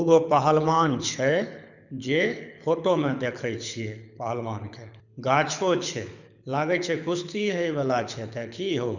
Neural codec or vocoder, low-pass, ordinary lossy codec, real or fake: codec, 24 kHz, 6 kbps, HILCodec; 7.2 kHz; none; fake